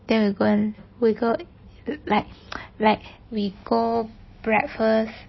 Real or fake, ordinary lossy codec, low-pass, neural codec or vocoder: real; MP3, 24 kbps; 7.2 kHz; none